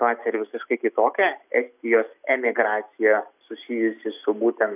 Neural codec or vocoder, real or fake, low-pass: none; real; 3.6 kHz